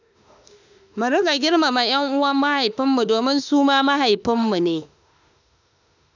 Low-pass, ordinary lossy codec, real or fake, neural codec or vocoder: 7.2 kHz; none; fake; autoencoder, 48 kHz, 32 numbers a frame, DAC-VAE, trained on Japanese speech